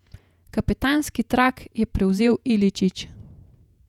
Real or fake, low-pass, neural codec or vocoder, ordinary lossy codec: fake; 19.8 kHz; vocoder, 48 kHz, 128 mel bands, Vocos; none